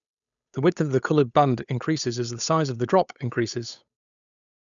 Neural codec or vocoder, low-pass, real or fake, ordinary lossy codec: codec, 16 kHz, 8 kbps, FunCodec, trained on Chinese and English, 25 frames a second; 7.2 kHz; fake; none